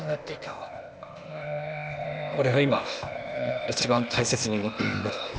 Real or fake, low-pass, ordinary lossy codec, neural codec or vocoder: fake; none; none; codec, 16 kHz, 0.8 kbps, ZipCodec